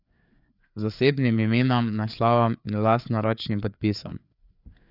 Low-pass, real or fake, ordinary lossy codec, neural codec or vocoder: 5.4 kHz; fake; none; codec, 16 kHz, 4 kbps, FreqCodec, larger model